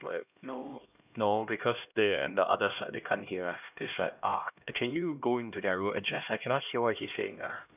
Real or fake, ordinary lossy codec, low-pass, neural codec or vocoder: fake; none; 3.6 kHz; codec, 16 kHz, 1 kbps, X-Codec, HuBERT features, trained on LibriSpeech